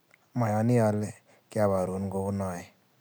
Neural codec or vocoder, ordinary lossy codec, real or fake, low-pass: none; none; real; none